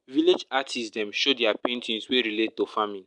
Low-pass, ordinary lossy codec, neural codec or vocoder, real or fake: 10.8 kHz; MP3, 96 kbps; none; real